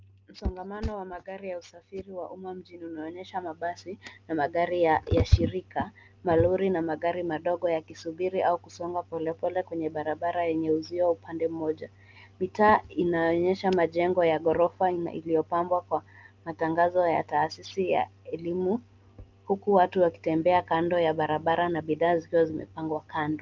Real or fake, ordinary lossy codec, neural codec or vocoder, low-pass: real; Opus, 24 kbps; none; 7.2 kHz